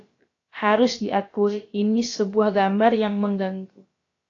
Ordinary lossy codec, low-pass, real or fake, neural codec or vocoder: AAC, 32 kbps; 7.2 kHz; fake; codec, 16 kHz, about 1 kbps, DyCAST, with the encoder's durations